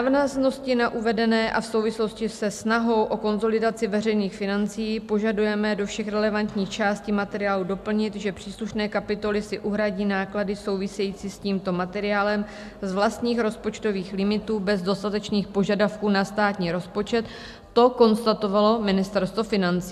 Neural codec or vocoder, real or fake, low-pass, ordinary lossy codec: none; real; 14.4 kHz; AAC, 96 kbps